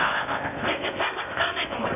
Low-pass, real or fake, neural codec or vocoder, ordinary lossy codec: 3.6 kHz; fake; codec, 16 kHz in and 24 kHz out, 0.6 kbps, FocalCodec, streaming, 4096 codes; none